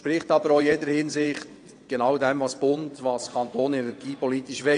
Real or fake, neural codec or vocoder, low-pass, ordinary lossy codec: fake; vocoder, 22.05 kHz, 80 mel bands, WaveNeXt; 9.9 kHz; AAC, 48 kbps